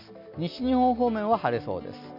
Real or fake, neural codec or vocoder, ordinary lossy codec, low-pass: real; none; MP3, 48 kbps; 5.4 kHz